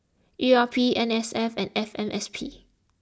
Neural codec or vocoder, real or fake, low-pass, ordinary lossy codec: none; real; none; none